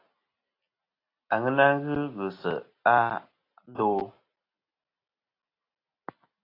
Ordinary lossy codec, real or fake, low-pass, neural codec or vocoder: AAC, 32 kbps; real; 5.4 kHz; none